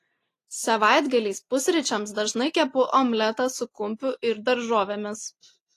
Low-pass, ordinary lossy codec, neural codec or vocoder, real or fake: 14.4 kHz; AAC, 48 kbps; none; real